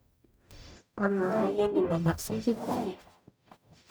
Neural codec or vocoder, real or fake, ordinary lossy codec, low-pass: codec, 44.1 kHz, 0.9 kbps, DAC; fake; none; none